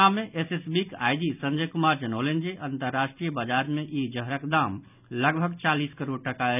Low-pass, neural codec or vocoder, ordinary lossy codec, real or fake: 3.6 kHz; none; none; real